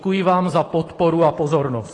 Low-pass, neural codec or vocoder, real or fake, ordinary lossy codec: 10.8 kHz; vocoder, 44.1 kHz, 128 mel bands every 512 samples, BigVGAN v2; fake; AAC, 32 kbps